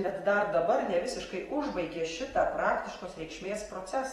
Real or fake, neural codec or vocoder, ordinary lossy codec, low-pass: fake; vocoder, 44.1 kHz, 128 mel bands every 256 samples, BigVGAN v2; AAC, 32 kbps; 19.8 kHz